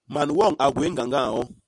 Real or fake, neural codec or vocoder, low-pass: real; none; 10.8 kHz